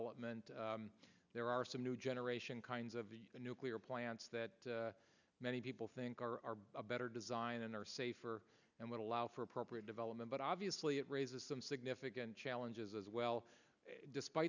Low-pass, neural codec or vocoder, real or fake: 7.2 kHz; none; real